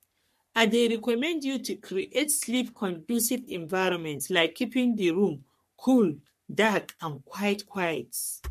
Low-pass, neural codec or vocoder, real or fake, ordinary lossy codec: 14.4 kHz; codec, 44.1 kHz, 3.4 kbps, Pupu-Codec; fake; MP3, 64 kbps